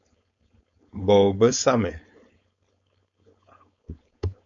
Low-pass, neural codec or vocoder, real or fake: 7.2 kHz; codec, 16 kHz, 4.8 kbps, FACodec; fake